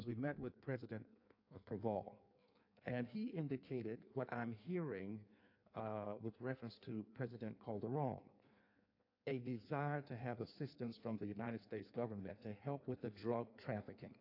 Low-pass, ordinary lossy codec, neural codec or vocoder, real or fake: 5.4 kHz; AAC, 32 kbps; codec, 16 kHz in and 24 kHz out, 1.1 kbps, FireRedTTS-2 codec; fake